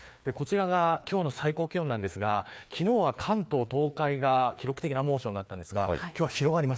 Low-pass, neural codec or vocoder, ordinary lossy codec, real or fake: none; codec, 16 kHz, 2 kbps, FreqCodec, larger model; none; fake